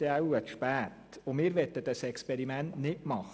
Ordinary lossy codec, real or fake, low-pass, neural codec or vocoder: none; real; none; none